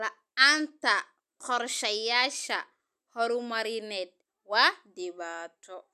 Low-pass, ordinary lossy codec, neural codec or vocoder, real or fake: 14.4 kHz; none; none; real